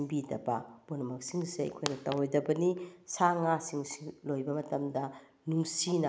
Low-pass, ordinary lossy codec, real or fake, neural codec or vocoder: none; none; real; none